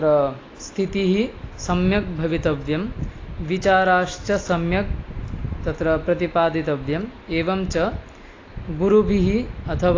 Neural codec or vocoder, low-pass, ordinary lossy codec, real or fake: none; 7.2 kHz; AAC, 32 kbps; real